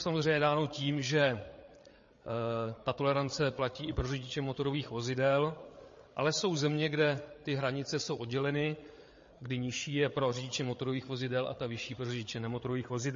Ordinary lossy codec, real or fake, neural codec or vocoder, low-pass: MP3, 32 kbps; fake; codec, 16 kHz, 8 kbps, FreqCodec, larger model; 7.2 kHz